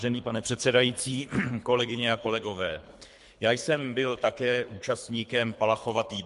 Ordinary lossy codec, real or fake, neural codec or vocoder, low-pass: MP3, 64 kbps; fake; codec, 24 kHz, 3 kbps, HILCodec; 10.8 kHz